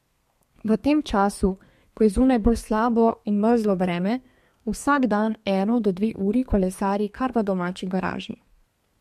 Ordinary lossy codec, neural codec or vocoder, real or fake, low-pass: MP3, 64 kbps; codec, 32 kHz, 1.9 kbps, SNAC; fake; 14.4 kHz